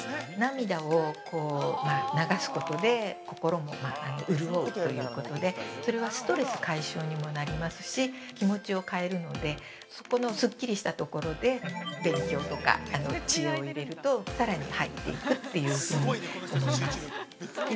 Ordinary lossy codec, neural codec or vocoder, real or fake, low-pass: none; none; real; none